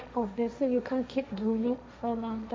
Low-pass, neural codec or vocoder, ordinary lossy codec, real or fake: none; codec, 16 kHz, 1.1 kbps, Voila-Tokenizer; none; fake